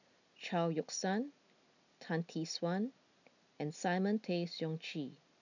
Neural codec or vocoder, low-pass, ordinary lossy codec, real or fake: none; 7.2 kHz; none; real